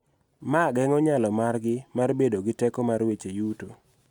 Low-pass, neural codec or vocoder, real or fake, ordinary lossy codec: 19.8 kHz; none; real; none